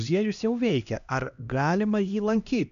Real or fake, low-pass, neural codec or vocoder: fake; 7.2 kHz; codec, 16 kHz, 1 kbps, X-Codec, HuBERT features, trained on LibriSpeech